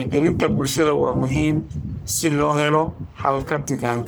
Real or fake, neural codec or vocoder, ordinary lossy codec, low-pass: fake; codec, 44.1 kHz, 1.7 kbps, Pupu-Codec; none; none